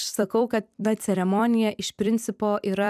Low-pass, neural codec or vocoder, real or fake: 14.4 kHz; vocoder, 44.1 kHz, 128 mel bands every 256 samples, BigVGAN v2; fake